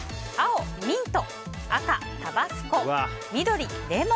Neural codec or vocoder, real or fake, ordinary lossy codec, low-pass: none; real; none; none